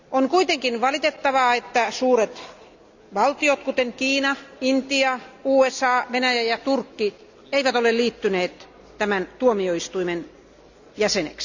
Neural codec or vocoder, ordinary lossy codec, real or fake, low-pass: none; none; real; 7.2 kHz